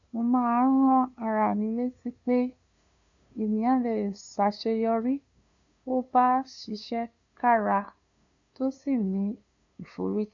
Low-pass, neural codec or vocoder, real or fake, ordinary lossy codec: 7.2 kHz; codec, 16 kHz, 2 kbps, FunCodec, trained on LibriTTS, 25 frames a second; fake; none